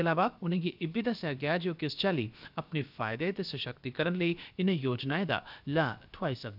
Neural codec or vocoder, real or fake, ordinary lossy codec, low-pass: codec, 16 kHz, about 1 kbps, DyCAST, with the encoder's durations; fake; none; 5.4 kHz